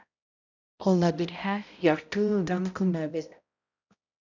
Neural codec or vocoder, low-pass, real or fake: codec, 16 kHz, 0.5 kbps, X-Codec, HuBERT features, trained on balanced general audio; 7.2 kHz; fake